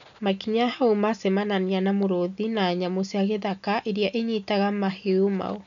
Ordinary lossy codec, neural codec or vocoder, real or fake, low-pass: none; none; real; 7.2 kHz